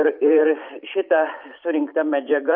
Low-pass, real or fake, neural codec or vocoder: 5.4 kHz; fake; vocoder, 44.1 kHz, 128 mel bands every 512 samples, BigVGAN v2